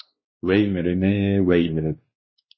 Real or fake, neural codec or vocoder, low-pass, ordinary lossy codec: fake; codec, 16 kHz, 2 kbps, X-Codec, WavLM features, trained on Multilingual LibriSpeech; 7.2 kHz; MP3, 24 kbps